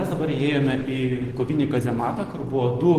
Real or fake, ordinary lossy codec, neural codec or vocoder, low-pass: real; Opus, 16 kbps; none; 14.4 kHz